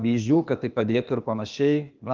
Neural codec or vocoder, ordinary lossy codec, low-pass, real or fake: codec, 16 kHz, 0.8 kbps, ZipCodec; Opus, 24 kbps; 7.2 kHz; fake